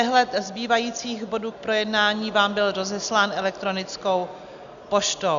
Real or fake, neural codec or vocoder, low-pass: real; none; 7.2 kHz